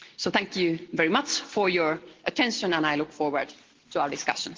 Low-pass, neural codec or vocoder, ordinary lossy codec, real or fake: 7.2 kHz; none; Opus, 16 kbps; real